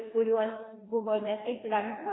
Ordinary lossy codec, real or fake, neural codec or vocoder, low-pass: AAC, 16 kbps; fake; codec, 16 kHz, 2 kbps, FreqCodec, larger model; 7.2 kHz